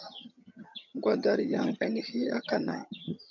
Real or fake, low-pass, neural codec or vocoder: fake; 7.2 kHz; vocoder, 22.05 kHz, 80 mel bands, HiFi-GAN